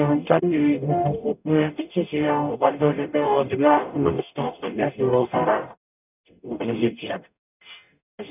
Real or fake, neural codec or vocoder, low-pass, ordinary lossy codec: fake; codec, 44.1 kHz, 0.9 kbps, DAC; 3.6 kHz; none